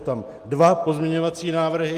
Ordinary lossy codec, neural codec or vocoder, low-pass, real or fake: Opus, 32 kbps; none; 14.4 kHz; real